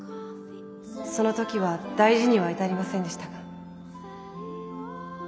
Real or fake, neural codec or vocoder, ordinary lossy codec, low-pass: real; none; none; none